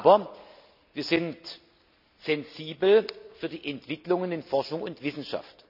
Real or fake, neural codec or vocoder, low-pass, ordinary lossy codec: real; none; 5.4 kHz; none